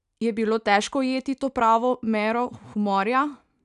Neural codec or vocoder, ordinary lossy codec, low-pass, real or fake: none; none; 10.8 kHz; real